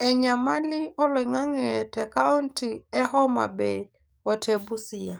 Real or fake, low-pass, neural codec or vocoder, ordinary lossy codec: fake; none; codec, 44.1 kHz, 7.8 kbps, DAC; none